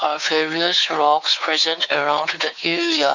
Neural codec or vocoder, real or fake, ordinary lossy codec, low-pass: codec, 16 kHz, 4 kbps, X-Codec, WavLM features, trained on Multilingual LibriSpeech; fake; none; 7.2 kHz